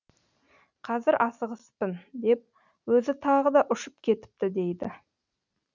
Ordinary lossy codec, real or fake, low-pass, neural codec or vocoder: none; real; 7.2 kHz; none